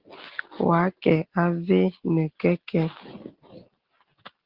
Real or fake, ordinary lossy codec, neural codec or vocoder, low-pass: real; Opus, 16 kbps; none; 5.4 kHz